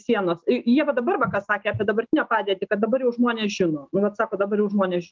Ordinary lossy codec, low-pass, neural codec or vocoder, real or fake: Opus, 24 kbps; 7.2 kHz; none; real